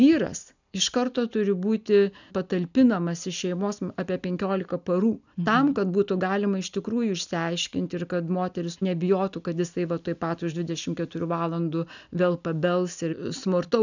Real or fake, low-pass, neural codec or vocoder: real; 7.2 kHz; none